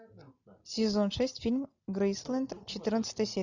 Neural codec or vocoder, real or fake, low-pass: none; real; 7.2 kHz